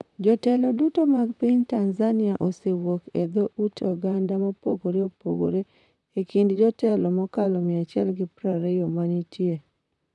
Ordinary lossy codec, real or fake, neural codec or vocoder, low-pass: none; fake; vocoder, 44.1 kHz, 128 mel bands, Pupu-Vocoder; 10.8 kHz